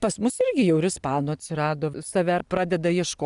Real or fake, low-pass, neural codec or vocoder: real; 10.8 kHz; none